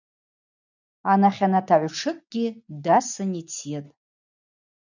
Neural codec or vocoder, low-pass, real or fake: none; 7.2 kHz; real